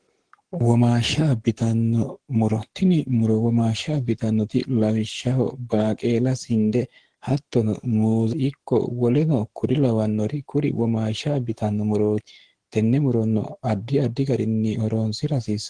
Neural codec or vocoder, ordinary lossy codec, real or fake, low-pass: codec, 44.1 kHz, 7.8 kbps, Pupu-Codec; Opus, 16 kbps; fake; 9.9 kHz